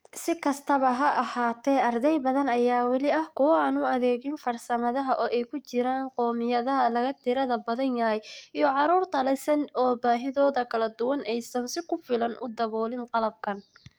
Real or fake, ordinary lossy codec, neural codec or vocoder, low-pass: fake; none; codec, 44.1 kHz, 7.8 kbps, DAC; none